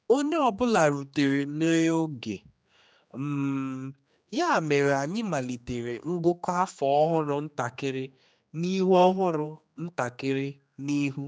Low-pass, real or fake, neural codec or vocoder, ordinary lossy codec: none; fake; codec, 16 kHz, 2 kbps, X-Codec, HuBERT features, trained on general audio; none